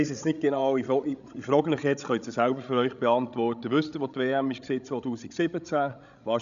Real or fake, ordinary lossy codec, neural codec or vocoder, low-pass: fake; none; codec, 16 kHz, 16 kbps, FreqCodec, larger model; 7.2 kHz